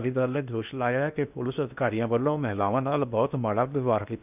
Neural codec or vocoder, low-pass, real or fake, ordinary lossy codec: codec, 16 kHz in and 24 kHz out, 0.8 kbps, FocalCodec, streaming, 65536 codes; 3.6 kHz; fake; none